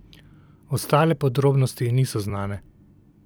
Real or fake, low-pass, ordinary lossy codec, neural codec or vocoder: fake; none; none; vocoder, 44.1 kHz, 128 mel bands every 256 samples, BigVGAN v2